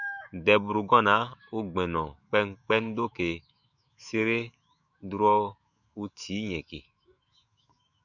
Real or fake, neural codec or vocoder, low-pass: fake; autoencoder, 48 kHz, 128 numbers a frame, DAC-VAE, trained on Japanese speech; 7.2 kHz